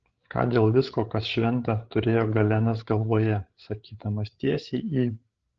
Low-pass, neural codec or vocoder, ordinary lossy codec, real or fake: 7.2 kHz; codec, 16 kHz, 8 kbps, FreqCodec, larger model; Opus, 16 kbps; fake